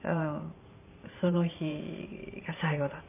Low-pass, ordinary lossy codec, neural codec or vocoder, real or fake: 3.6 kHz; none; vocoder, 44.1 kHz, 128 mel bands every 512 samples, BigVGAN v2; fake